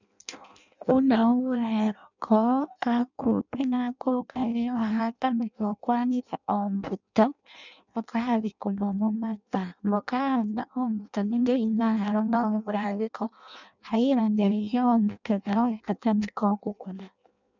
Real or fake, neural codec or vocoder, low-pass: fake; codec, 16 kHz in and 24 kHz out, 0.6 kbps, FireRedTTS-2 codec; 7.2 kHz